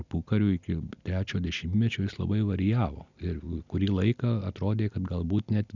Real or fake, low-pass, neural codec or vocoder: real; 7.2 kHz; none